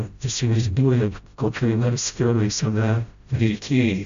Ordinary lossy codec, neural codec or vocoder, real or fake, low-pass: MP3, 64 kbps; codec, 16 kHz, 0.5 kbps, FreqCodec, smaller model; fake; 7.2 kHz